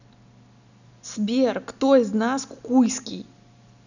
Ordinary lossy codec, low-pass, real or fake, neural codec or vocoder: none; 7.2 kHz; real; none